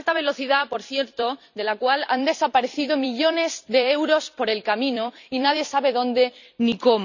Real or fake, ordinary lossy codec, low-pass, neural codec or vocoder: fake; none; 7.2 kHz; vocoder, 44.1 kHz, 128 mel bands every 256 samples, BigVGAN v2